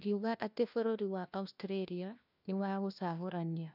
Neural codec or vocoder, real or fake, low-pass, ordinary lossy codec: codec, 16 kHz, 1 kbps, FunCodec, trained on LibriTTS, 50 frames a second; fake; 5.4 kHz; none